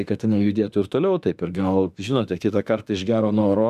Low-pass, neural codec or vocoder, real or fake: 14.4 kHz; autoencoder, 48 kHz, 32 numbers a frame, DAC-VAE, trained on Japanese speech; fake